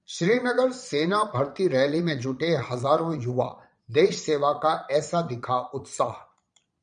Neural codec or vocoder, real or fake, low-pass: vocoder, 22.05 kHz, 80 mel bands, Vocos; fake; 9.9 kHz